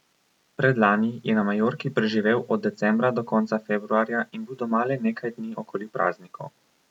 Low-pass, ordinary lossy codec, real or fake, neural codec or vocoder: 19.8 kHz; none; real; none